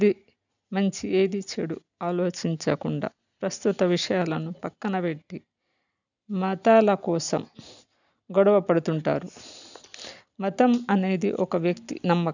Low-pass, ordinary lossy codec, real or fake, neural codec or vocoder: 7.2 kHz; none; real; none